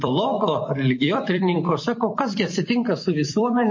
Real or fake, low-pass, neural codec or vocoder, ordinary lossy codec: fake; 7.2 kHz; vocoder, 44.1 kHz, 128 mel bands every 256 samples, BigVGAN v2; MP3, 32 kbps